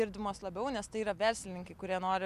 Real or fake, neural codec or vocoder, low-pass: real; none; 14.4 kHz